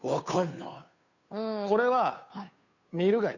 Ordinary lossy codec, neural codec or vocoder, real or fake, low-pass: none; codec, 16 kHz, 2 kbps, FunCodec, trained on Chinese and English, 25 frames a second; fake; 7.2 kHz